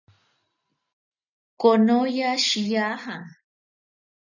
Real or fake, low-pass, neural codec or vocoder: real; 7.2 kHz; none